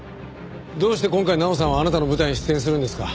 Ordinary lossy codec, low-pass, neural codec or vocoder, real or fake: none; none; none; real